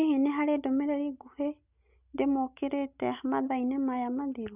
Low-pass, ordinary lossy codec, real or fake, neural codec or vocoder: 3.6 kHz; none; real; none